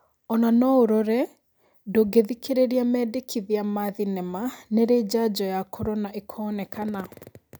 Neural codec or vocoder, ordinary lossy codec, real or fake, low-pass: none; none; real; none